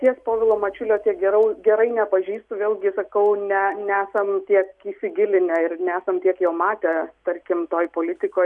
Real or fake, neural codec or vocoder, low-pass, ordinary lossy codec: real; none; 10.8 kHz; MP3, 96 kbps